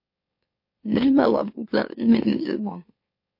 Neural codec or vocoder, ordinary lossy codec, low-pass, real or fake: autoencoder, 44.1 kHz, a latent of 192 numbers a frame, MeloTTS; MP3, 32 kbps; 5.4 kHz; fake